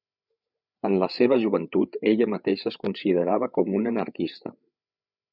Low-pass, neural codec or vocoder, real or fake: 5.4 kHz; codec, 16 kHz, 8 kbps, FreqCodec, larger model; fake